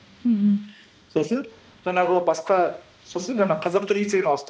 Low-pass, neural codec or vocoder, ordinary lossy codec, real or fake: none; codec, 16 kHz, 1 kbps, X-Codec, HuBERT features, trained on balanced general audio; none; fake